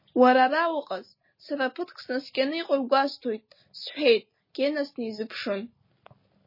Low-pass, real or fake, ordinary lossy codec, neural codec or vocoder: 5.4 kHz; real; MP3, 24 kbps; none